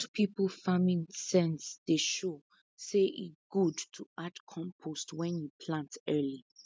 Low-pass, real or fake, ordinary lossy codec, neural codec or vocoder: none; real; none; none